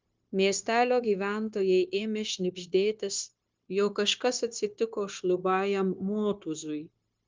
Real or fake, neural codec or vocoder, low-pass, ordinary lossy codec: fake; codec, 16 kHz, 0.9 kbps, LongCat-Audio-Codec; 7.2 kHz; Opus, 24 kbps